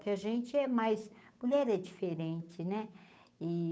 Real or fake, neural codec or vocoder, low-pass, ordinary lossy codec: fake; codec, 16 kHz, 8 kbps, FunCodec, trained on Chinese and English, 25 frames a second; none; none